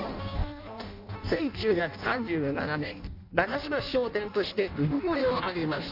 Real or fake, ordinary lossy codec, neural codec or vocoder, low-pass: fake; none; codec, 16 kHz in and 24 kHz out, 0.6 kbps, FireRedTTS-2 codec; 5.4 kHz